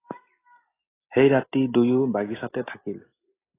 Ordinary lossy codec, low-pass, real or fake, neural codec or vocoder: AAC, 16 kbps; 3.6 kHz; real; none